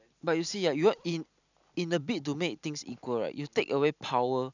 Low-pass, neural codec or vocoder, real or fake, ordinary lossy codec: 7.2 kHz; none; real; none